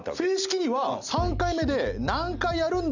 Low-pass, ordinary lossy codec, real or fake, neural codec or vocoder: 7.2 kHz; none; real; none